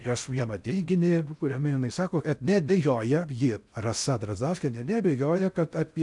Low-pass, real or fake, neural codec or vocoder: 10.8 kHz; fake; codec, 16 kHz in and 24 kHz out, 0.6 kbps, FocalCodec, streaming, 4096 codes